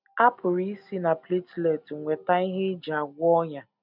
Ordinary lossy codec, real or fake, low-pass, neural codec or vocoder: none; real; 5.4 kHz; none